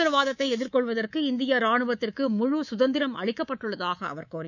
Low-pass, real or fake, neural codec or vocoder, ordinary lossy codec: 7.2 kHz; fake; codec, 24 kHz, 3.1 kbps, DualCodec; none